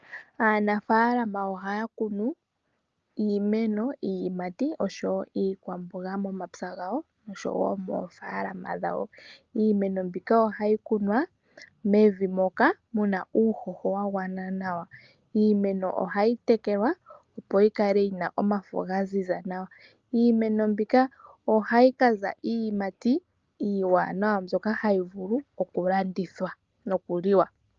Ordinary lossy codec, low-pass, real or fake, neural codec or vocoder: Opus, 24 kbps; 7.2 kHz; real; none